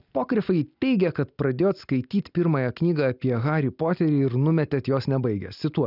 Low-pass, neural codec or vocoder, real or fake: 5.4 kHz; none; real